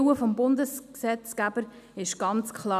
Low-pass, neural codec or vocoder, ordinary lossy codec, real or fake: 14.4 kHz; none; none; real